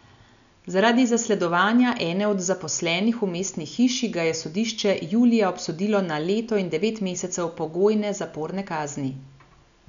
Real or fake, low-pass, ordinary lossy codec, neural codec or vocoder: real; 7.2 kHz; none; none